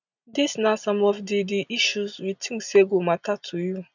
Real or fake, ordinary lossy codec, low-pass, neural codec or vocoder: real; none; 7.2 kHz; none